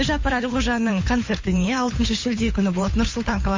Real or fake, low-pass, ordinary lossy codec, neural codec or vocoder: fake; 7.2 kHz; MP3, 32 kbps; codec, 16 kHz, 8 kbps, FunCodec, trained on Chinese and English, 25 frames a second